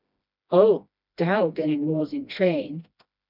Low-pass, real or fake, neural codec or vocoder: 5.4 kHz; fake; codec, 16 kHz, 1 kbps, FreqCodec, smaller model